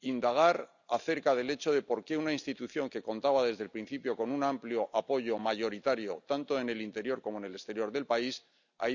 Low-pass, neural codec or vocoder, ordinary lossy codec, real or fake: 7.2 kHz; none; none; real